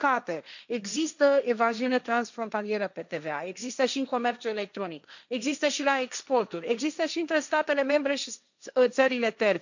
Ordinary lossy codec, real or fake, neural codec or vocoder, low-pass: none; fake; codec, 16 kHz, 1.1 kbps, Voila-Tokenizer; 7.2 kHz